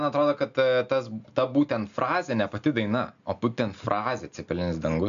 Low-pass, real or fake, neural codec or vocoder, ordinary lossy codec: 7.2 kHz; real; none; MP3, 64 kbps